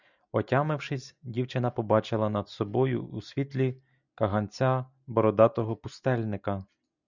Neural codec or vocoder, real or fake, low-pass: none; real; 7.2 kHz